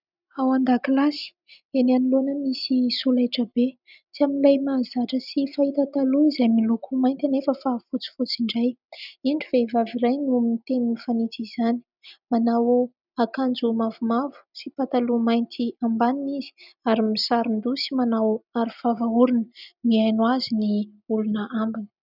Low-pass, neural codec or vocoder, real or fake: 5.4 kHz; none; real